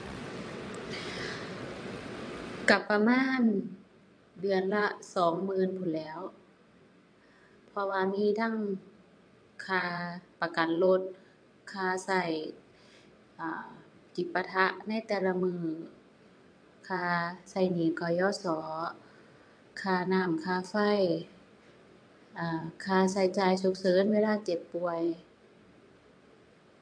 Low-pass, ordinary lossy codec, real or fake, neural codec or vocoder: 9.9 kHz; MP3, 64 kbps; fake; vocoder, 22.05 kHz, 80 mel bands, WaveNeXt